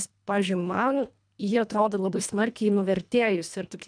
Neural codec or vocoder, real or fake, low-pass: codec, 24 kHz, 1.5 kbps, HILCodec; fake; 9.9 kHz